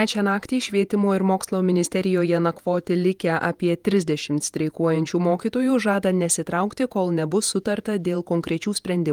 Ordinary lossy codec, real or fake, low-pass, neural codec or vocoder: Opus, 32 kbps; fake; 19.8 kHz; vocoder, 48 kHz, 128 mel bands, Vocos